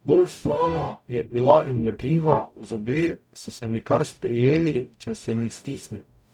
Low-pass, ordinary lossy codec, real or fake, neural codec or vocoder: 19.8 kHz; none; fake; codec, 44.1 kHz, 0.9 kbps, DAC